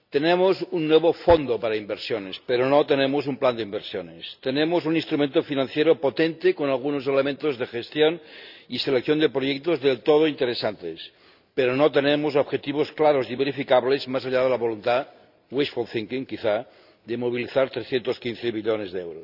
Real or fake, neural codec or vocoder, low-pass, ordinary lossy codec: real; none; 5.4 kHz; none